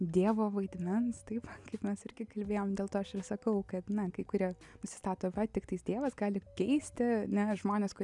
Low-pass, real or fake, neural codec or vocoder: 10.8 kHz; real; none